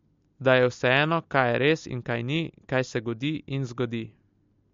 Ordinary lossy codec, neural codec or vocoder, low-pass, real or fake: MP3, 48 kbps; none; 7.2 kHz; real